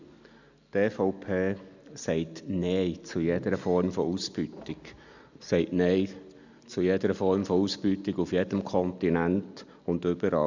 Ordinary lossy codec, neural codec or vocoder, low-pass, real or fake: none; none; 7.2 kHz; real